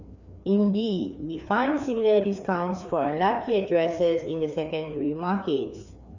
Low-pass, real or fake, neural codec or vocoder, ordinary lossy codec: 7.2 kHz; fake; codec, 16 kHz, 2 kbps, FreqCodec, larger model; none